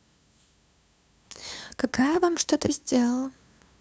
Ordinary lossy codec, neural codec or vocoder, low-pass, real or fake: none; codec, 16 kHz, 2 kbps, FunCodec, trained on LibriTTS, 25 frames a second; none; fake